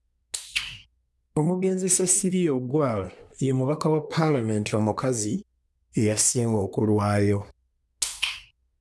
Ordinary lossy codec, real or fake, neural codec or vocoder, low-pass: none; fake; codec, 24 kHz, 1 kbps, SNAC; none